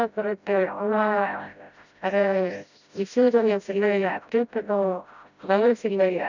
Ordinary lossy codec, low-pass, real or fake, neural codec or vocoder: none; 7.2 kHz; fake; codec, 16 kHz, 0.5 kbps, FreqCodec, smaller model